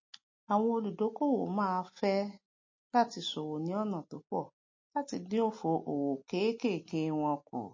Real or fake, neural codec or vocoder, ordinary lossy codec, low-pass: real; none; MP3, 32 kbps; 7.2 kHz